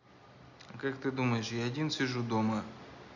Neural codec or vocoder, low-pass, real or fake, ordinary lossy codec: none; 7.2 kHz; real; none